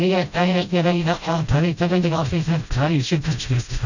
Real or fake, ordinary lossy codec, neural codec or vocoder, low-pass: fake; none; codec, 16 kHz, 0.5 kbps, FreqCodec, smaller model; 7.2 kHz